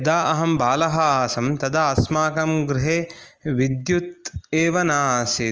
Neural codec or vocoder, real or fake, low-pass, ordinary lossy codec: none; real; 7.2 kHz; Opus, 24 kbps